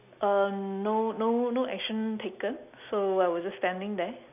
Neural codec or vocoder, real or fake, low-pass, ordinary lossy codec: none; real; 3.6 kHz; none